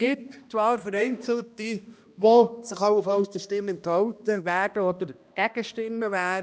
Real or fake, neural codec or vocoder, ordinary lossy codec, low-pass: fake; codec, 16 kHz, 1 kbps, X-Codec, HuBERT features, trained on balanced general audio; none; none